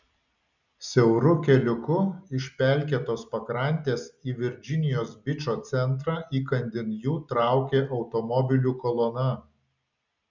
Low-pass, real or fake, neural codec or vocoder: 7.2 kHz; real; none